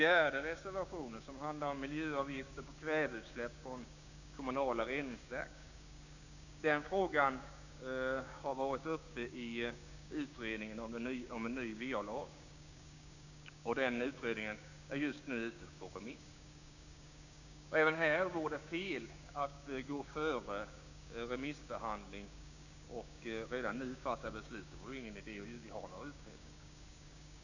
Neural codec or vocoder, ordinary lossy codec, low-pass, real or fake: codec, 16 kHz, 6 kbps, DAC; none; 7.2 kHz; fake